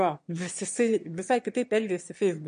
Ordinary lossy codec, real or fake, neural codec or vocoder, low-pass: MP3, 48 kbps; fake; autoencoder, 22.05 kHz, a latent of 192 numbers a frame, VITS, trained on one speaker; 9.9 kHz